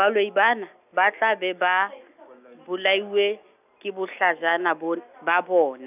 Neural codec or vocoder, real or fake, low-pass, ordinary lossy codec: none; real; 3.6 kHz; none